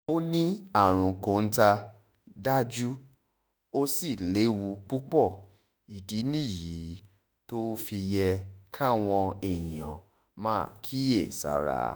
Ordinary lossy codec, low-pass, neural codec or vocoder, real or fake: none; none; autoencoder, 48 kHz, 32 numbers a frame, DAC-VAE, trained on Japanese speech; fake